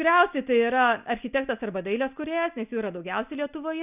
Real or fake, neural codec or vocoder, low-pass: real; none; 3.6 kHz